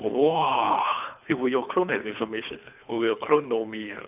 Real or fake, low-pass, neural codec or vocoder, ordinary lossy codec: fake; 3.6 kHz; codec, 24 kHz, 3 kbps, HILCodec; none